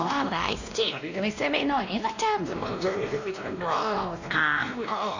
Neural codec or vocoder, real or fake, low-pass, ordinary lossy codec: codec, 16 kHz, 1 kbps, X-Codec, WavLM features, trained on Multilingual LibriSpeech; fake; 7.2 kHz; none